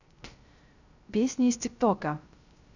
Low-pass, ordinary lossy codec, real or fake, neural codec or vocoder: 7.2 kHz; none; fake; codec, 16 kHz, 0.3 kbps, FocalCodec